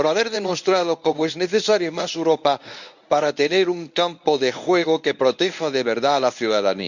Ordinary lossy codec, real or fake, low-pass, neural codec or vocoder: none; fake; 7.2 kHz; codec, 24 kHz, 0.9 kbps, WavTokenizer, medium speech release version 1